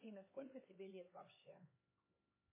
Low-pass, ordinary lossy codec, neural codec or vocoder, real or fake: 3.6 kHz; MP3, 16 kbps; codec, 16 kHz, 4 kbps, X-Codec, HuBERT features, trained on LibriSpeech; fake